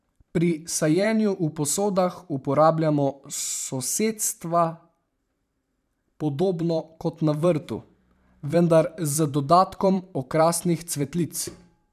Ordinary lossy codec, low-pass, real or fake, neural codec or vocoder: none; 14.4 kHz; fake; vocoder, 44.1 kHz, 128 mel bands every 512 samples, BigVGAN v2